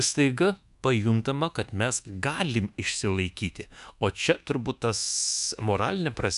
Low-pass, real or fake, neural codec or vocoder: 10.8 kHz; fake; codec, 24 kHz, 1.2 kbps, DualCodec